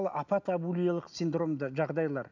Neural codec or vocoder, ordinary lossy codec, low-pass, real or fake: none; none; 7.2 kHz; real